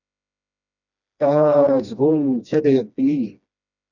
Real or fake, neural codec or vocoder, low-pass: fake; codec, 16 kHz, 1 kbps, FreqCodec, smaller model; 7.2 kHz